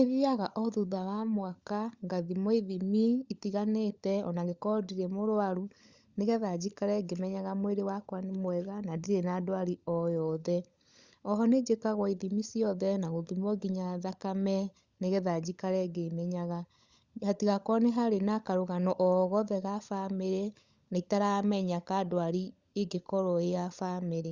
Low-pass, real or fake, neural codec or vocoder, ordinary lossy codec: 7.2 kHz; fake; codec, 16 kHz, 16 kbps, FunCodec, trained on LibriTTS, 50 frames a second; Opus, 64 kbps